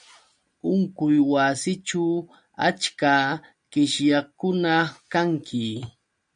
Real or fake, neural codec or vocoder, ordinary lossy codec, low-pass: real; none; MP3, 96 kbps; 9.9 kHz